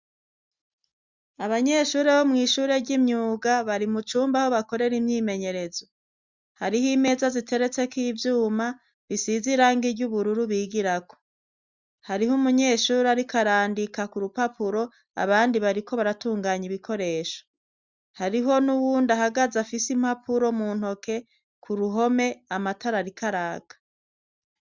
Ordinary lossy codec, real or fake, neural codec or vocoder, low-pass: Opus, 64 kbps; real; none; 7.2 kHz